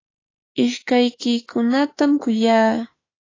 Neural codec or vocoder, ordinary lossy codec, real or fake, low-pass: autoencoder, 48 kHz, 32 numbers a frame, DAC-VAE, trained on Japanese speech; AAC, 32 kbps; fake; 7.2 kHz